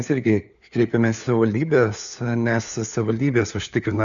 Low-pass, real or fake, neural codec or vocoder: 7.2 kHz; fake; codec, 16 kHz, 4 kbps, FunCodec, trained on LibriTTS, 50 frames a second